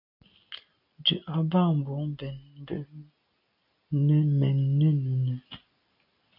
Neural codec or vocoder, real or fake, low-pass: none; real; 5.4 kHz